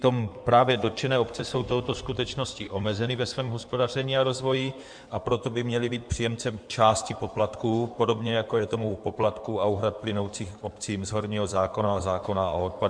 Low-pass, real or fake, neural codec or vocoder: 9.9 kHz; fake; codec, 16 kHz in and 24 kHz out, 2.2 kbps, FireRedTTS-2 codec